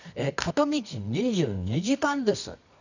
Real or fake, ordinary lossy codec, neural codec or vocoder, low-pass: fake; none; codec, 24 kHz, 0.9 kbps, WavTokenizer, medium music audio release; 7.2 kHz